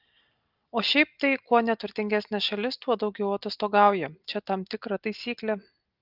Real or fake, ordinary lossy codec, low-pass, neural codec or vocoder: real; Opus, 32 kbps; 5.4 kHz; none